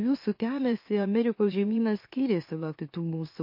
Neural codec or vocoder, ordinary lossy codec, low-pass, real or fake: autoencoder, 44.1 kHz, a latent of 192 numbers a frame, MeloTTS; MP3, 32 kbps; 5.4 kHz; fake